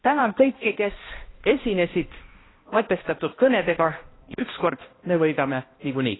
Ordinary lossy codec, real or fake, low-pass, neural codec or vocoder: AAC, 16 kbps; fake; 7.2 kHz; codec, 16 kHz, 1 kbps, X-Codec, HuBERT features, trained on balanced general audio